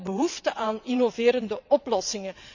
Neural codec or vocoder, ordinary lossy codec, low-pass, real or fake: vocoder, 22.05 kHz, 80 mel bands, WaveNeXt; none; 7.2 kHz; fake